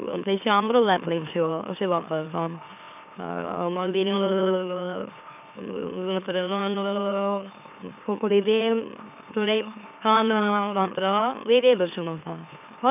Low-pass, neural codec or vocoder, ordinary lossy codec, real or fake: 3.6 kHz; autoencoder, 44.1 kHz, a latent of 192 numbers a frame, MeloTTS; none; fake